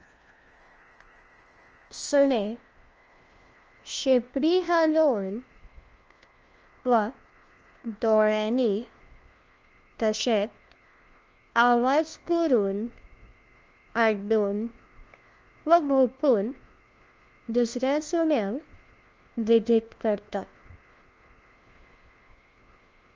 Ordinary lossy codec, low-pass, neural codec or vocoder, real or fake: Opus, 24 kbps; 7.2 kHz; codec, 16 kHz, 1 kbps, FunCodec, trained on LibriTTS, 50 frames a second; fake